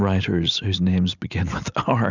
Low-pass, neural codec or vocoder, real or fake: 7.2 kHz; none; real